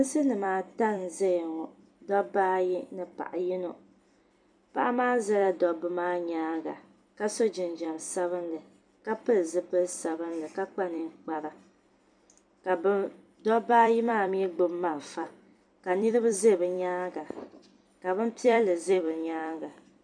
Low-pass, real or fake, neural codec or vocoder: 9.9 kHz; fake; vocoder, 44.1 kHz, 128 mel bands every 256 samples, BigVGAN v2